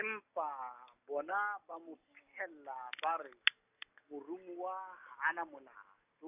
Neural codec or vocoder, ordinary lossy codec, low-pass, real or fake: none; none; 3.6 kHz; real